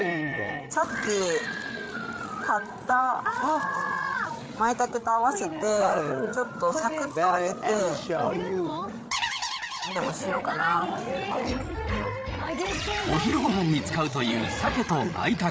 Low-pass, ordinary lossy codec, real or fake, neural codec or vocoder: none; none; fake; codec, 16 kHz, 8 kbps, FreqCodec, larger model